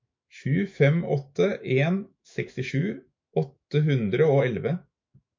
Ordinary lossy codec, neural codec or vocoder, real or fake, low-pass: MP3, 48 kbps; autoencoder, 48 kHz, 128 numbers a frame, DAC-VAE, trained on Japanese speech; fake; 7.2 kHz